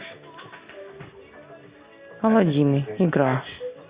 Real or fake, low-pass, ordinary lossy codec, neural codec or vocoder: real; 3.6 kHz; Opus, 64 kbps; none